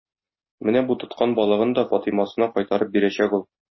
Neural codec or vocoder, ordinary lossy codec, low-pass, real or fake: none; MP3, 24 kbps; 7.2 kHz; real